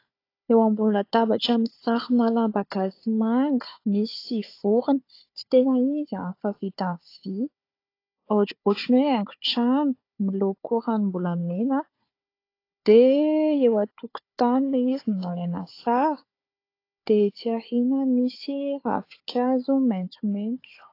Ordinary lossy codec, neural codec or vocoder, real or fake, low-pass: AAC, 32 kbps; codec, 16 kHz, 4 kbps, FunCodec, trained on Chinese and English, 50 frames a second; fake; 5.4 kHz